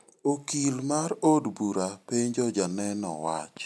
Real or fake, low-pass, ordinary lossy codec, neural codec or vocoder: real; none; none; none